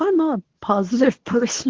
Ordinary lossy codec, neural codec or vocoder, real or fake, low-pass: Opus, 16 kbps; codec, 24 kHz, 0.9 kbps, WavTokenizer, medium speech release version 2; fake; 7.2 kHz